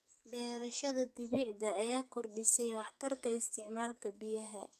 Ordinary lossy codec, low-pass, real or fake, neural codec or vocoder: none; 14.4 kHz; fake; codec, 44.1 kHz, 2.6 kbps, SNAC